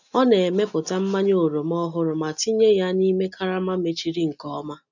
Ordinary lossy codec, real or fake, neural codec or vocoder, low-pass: none; real; none; 7.2 kHz